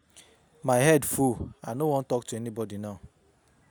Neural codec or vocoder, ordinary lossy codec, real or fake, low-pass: none; none; real; none